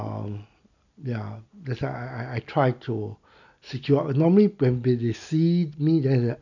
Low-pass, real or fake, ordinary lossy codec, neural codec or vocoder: 7.2 kHz; real; none; none